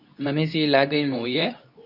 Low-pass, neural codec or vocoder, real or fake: 5.4 kHz; codec, 24 kHz, 0.9 kbps, WavTokenizer, medium speech release version 2; fake